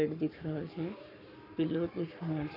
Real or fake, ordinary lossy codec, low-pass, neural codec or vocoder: fake; none; 5.4 kHz; codec, 44.1 kHz, 7.8 kbps, Pupu-Codec